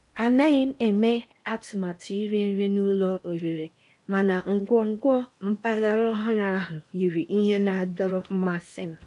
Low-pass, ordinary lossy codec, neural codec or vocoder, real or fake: 10.8 kHz; none; codec, 16 kHz in and 24 kHz out, 0.8 kbps, FocalCodec, streaming, 65536 codes; fake